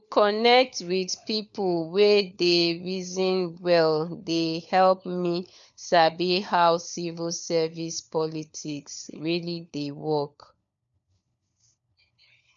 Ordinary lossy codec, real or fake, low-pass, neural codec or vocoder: none; fake; 7.2 kHz; codec, 16 kHz, 4 kbps, FunCodec, trained on LibriTTS, 50 frames a second